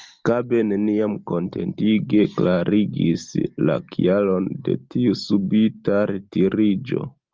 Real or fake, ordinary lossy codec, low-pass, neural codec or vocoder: real; Opus, 24 kbps; 7.2 kHz; none